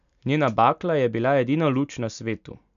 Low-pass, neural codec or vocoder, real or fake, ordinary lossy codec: 7.2 kHz; none; real; none